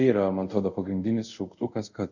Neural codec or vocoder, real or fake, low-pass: codec, 24 kHz, 0.5 kbps, DualCodec; fake; 7.2 kHz